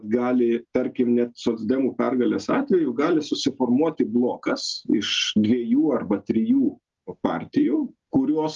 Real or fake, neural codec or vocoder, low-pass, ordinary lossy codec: real; none; 7.2 kHz; Opus, 32 kbps